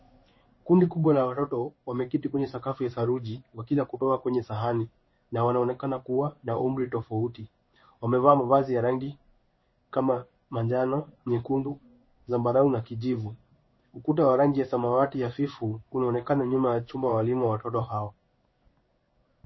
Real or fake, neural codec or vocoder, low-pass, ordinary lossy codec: fake; codec, 16 kHz in and 24 kHz out, 1 kbps, XY-Tokenizer; 7.2 kHz; MP3, 24 kbps